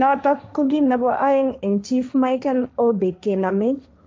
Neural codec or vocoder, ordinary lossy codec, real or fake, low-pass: codec, 16 kHz, 1.1 kbps, Voila-Tokenizer; none; fake; none